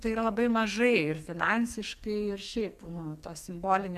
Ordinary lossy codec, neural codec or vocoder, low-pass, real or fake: MP3, 96 kbps; codec, 44.1 kHz, 2.6 kbps, SNAC; 14.4 kHz; fake